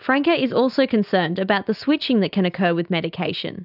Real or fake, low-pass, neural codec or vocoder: real; 5.4 kHz; none